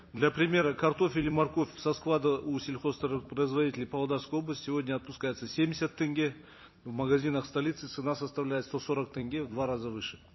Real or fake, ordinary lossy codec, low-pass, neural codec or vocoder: fake; MP3, 24 kbps; 7.2 kHz; vocoder, 44.1 kHz, 128 mel bands every 256 samples, BigVGAN v2